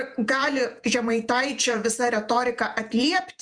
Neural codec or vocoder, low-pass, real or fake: vocoder, 48 kHz, 128 mel bands, Vocos; 9.9 kHz; fake